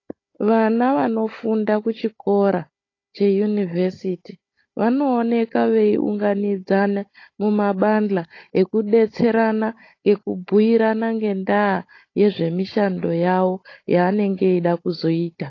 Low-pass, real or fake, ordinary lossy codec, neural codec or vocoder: 7.2 kHz; fake; AAC, 32 kbps; codec, 16 kHz, 16 kbps, FunCodec, trained on Chinese and English, 50 frames a second